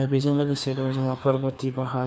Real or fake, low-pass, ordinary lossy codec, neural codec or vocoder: fake; none; none; codec, 16 kHz, 2 kbps, FreqCodec, larger model